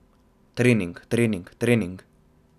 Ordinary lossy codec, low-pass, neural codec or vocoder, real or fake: none; 14.4 kHz; none; real